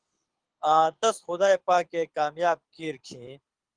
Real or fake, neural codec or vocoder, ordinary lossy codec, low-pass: fake; codec, 24 kHz, 6 kbps, HILCodec; Opus, 24 kbps; 9.9 kHz